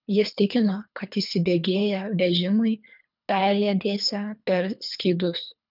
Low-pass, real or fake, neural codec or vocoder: 5.4 kHz; fake; codec, 24 kHz, 3 kbps, HILCodec